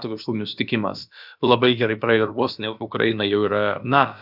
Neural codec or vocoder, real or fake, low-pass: codec, 16 kHz, about 1 kbps, DyCAST, with the encoder's durations; fake; 5.4 kHz